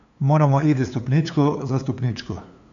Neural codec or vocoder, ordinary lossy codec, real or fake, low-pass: codec, 16 kHz, 2 kbps, FunCodec, trained on LibriTTS, 25 frames a second; AAC, 64 kbps; fake; 7.2 kHz